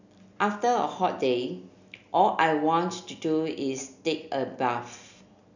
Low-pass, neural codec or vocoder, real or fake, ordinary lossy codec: 7.2 kHz; none; real; none